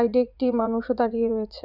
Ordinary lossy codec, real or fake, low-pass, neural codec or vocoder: Opus, 64 kbps; fake; 5.4 kHz; vocoder, 44.1 kHz, 80 mel bands, Vocos